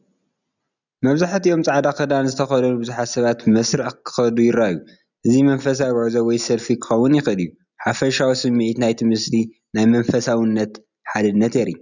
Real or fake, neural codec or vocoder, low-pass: real; none; 7.2 kHz